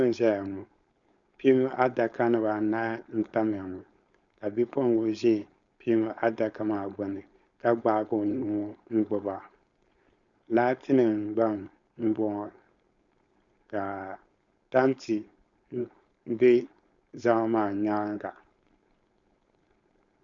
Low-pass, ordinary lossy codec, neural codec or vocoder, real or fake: 7.2 kHz; Opus, 64 kbps; codec, 16 kHz, 4.8 kbps, FACodec; fake